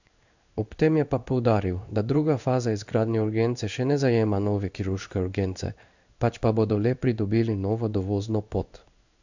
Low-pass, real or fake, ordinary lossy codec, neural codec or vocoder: 7.2 kHz; fake; none; codec, 16 kHz in and 24 kHz out, 1 kbps, XY-Tokenizer